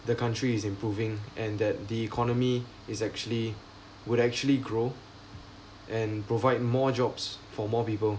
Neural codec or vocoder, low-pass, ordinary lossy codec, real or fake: none; none; none; real